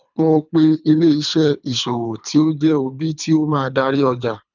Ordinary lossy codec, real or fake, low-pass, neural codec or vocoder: none; fake; 7.2 kHz; codec, 24 kHz, 3 kbps, HILCodec